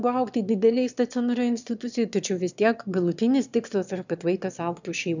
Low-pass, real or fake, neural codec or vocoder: 7.2 kHz; fake; autoencoder, 22.05 kHz, a latent of 192 numbers a frame, VITS, trained on one speaker